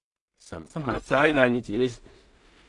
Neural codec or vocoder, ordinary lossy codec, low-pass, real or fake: codec, 16 kHz in and 24 kHz out, 0.4 kbps, LongCat-Audio-Codec, two codebook decoder; AAC, 64 kbps; 10.8 kHz; fake